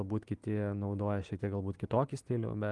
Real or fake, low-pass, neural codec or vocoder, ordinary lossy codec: real; 10.8 kHz; none; Opus, 24 kbps